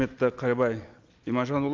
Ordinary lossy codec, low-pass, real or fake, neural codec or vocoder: Opus, 32 kbps; 7.2 kHz; real; none